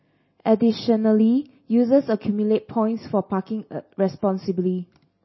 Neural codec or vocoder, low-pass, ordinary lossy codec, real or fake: none; 7.2 kHz; MP3, 24 kbps; real